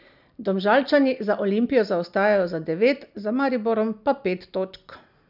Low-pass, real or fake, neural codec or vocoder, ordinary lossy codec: 5.4 kHz; real; none; none